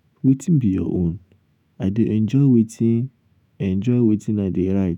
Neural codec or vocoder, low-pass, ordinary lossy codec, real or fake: autoencoder, 48 kHz, 128 numbers a frame, DAC-VAE, trained on Japanese speech; 19.8 kHz; none; fake